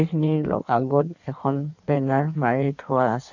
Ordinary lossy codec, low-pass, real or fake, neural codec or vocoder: none; 7.2 kHz; fake; codec, 16 kHz in and 24 kHz out, 1.1 kbps, FireRedTTS-2 codec